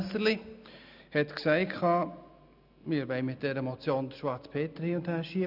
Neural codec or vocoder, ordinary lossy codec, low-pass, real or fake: none; none; 5.4 kHz; real